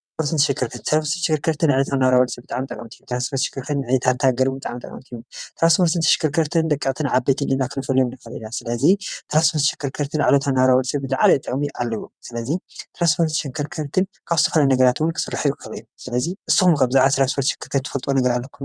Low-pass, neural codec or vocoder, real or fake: 9.9 kHz; vocoder, 22.05 kHz, 80 mel bands, WaveNeXt; fake